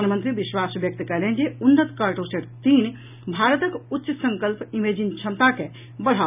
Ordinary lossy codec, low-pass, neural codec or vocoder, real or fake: none; 3.6 kHz; none; real